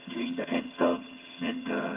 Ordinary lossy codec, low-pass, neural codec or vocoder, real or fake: Opus, 24 kbps; 3.6 kHz; vocoder, 22.05 kHz, 80 mel bands, HiFi-GAN; fake